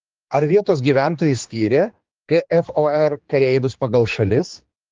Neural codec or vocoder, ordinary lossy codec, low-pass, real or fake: codec, 16 kHz, 2 kbps, X-Codec, HuBERT features, trained on general audio; Opus, 32 kbps; 7.2 kHz; fake